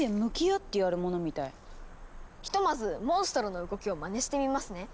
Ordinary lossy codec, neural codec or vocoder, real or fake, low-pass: none; none; real; none